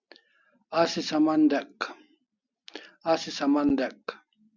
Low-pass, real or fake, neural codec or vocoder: 7.2 kHz; real; none